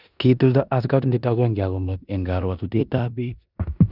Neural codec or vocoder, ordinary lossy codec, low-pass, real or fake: codec, 16 kHz in and 24 kHz out, 0.9 kbps, LongCat-Audio-Codec, four codebook decoder; none; 5.4 kHz; fake